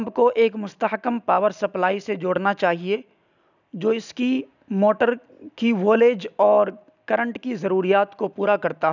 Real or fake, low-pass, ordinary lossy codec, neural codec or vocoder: real; 7.2 kHz; none; none